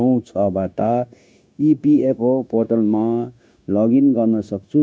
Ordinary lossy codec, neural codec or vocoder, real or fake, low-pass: none; codec, 16 kHz, 0.9 kbps, LongCat-Audio-Codec; fake; none